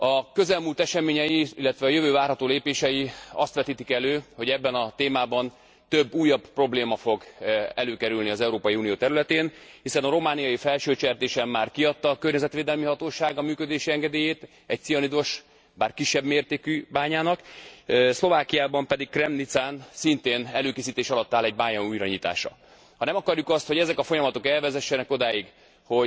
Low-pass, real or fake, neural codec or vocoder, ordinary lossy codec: none; real; none; none